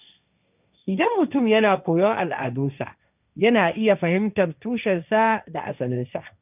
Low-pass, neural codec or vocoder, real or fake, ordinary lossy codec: 3.6 kHz; codec, 16 kHz, 1.1 kbps, Voila-Tokenizer; fake; none